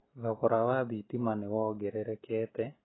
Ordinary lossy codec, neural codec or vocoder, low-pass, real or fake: AAC, 16 kbps; none; 7.2 kHz; real